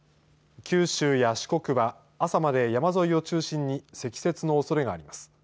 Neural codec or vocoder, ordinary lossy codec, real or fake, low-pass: none; none; real; none